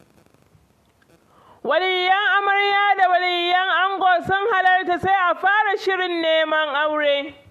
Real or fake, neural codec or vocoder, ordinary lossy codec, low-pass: real; none; MP3, 64 kbps; 14.4 kHz